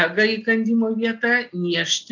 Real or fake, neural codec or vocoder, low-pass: real; none; 7.2 kHz